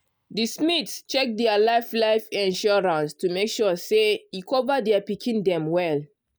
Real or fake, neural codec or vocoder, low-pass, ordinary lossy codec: real; none; none; none